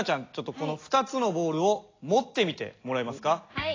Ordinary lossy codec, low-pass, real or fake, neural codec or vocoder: AAC, 32 kbps; 7.2 kHz; real; none